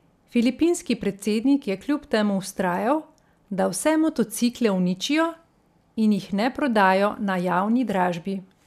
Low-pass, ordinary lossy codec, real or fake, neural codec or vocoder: 14.4 kHz; none; real; none